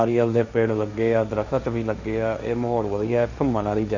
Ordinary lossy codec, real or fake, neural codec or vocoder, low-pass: none; fake; codec, 16 kHz, 1.1 kbps, Voila-Tokenizer; 7.2 kHz